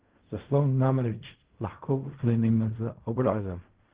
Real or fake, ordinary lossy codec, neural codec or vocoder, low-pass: fake; Opus, 16 kbps; codec, 16 kHz in and 24 kHz out, 0.4 kbps, LongCat-Audio-Codec, fine tuned four codebook decoder; 3.6 kHz